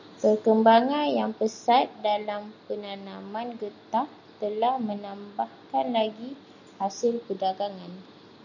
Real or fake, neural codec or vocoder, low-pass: real; none; 7.2 kHz